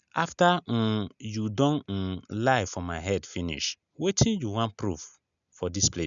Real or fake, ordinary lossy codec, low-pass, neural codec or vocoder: real; none; 7.2 kHz; none